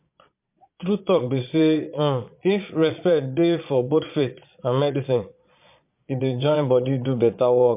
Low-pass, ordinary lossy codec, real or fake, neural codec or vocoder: 3.6 kHz; MP3, 32 kbps; fake; vocoder, 22.05 kHz, 80 mel bands, Vocos